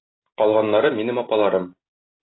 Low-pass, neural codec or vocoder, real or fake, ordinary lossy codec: 7.2 kHz; none; real; AAC, 16 kbps